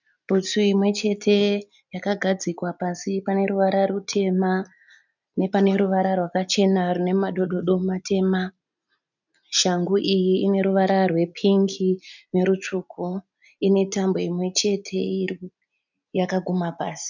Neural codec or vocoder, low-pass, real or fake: none; 7.2 kHz; real